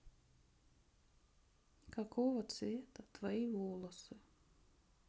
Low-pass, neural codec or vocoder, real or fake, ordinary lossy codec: none; none; real; none